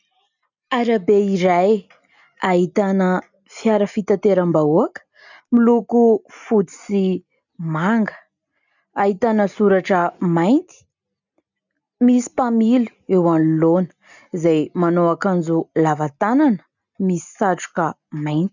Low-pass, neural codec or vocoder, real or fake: 7.2 kHz; none; real